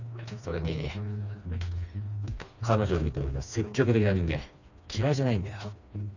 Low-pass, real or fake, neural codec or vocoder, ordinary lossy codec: 7.2 kHz; fake; codec, 16 kHz, 2 kbps, FreqCodec, smaller model; none